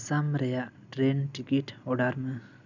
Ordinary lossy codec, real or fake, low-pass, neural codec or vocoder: none; real; 7.2 kHz; none